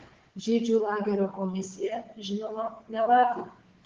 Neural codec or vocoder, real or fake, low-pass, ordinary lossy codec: codec, 16 kHz, 4 kbps, FunCodec, trained on Chinese and English, 50 frames a second; fake; 7.2 kHz; Opus, 16 kbps